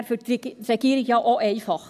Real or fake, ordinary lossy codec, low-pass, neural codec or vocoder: real; none; 14.4 kHz; none